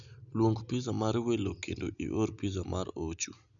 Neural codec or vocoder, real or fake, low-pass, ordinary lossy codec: none; real; 7.2 kHz; none